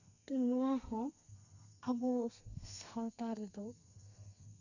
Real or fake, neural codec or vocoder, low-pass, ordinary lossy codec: fake; codec, 32 kHz, 1.9 kbps, SNAC; 7.2 kHz; none